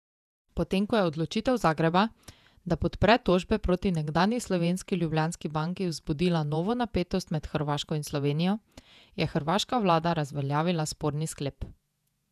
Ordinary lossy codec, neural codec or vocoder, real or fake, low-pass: none; vocoder, 48 kHz, 128 mel bands, Vocos; fake; 14.4 kHz